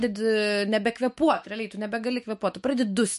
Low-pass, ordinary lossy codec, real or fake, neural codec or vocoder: 14.4 kHz; MP3, 48 kbps; fake; autoencoder, 48 kHz, 128 numbers a frame, DAC-VAE, trained on Japanese speech